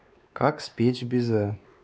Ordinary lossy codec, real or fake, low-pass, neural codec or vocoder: none; fake; none; codec, 16 kHz, 2 kbps, X-Codec, WavLM features, trained on Multilingual LibriSpeech